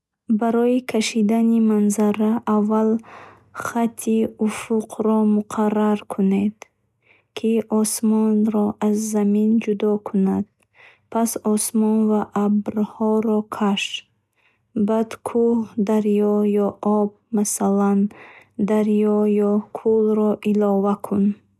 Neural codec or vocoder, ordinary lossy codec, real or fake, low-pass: none; none; real; none